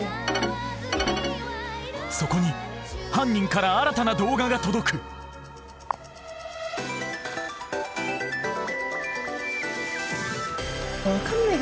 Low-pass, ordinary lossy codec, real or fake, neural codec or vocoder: none; none; real; none